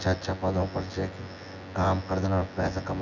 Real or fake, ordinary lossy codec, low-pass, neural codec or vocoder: fake; none; 7.2 kHz; vocoder, 24 kHz, 100 mel bands, Vocos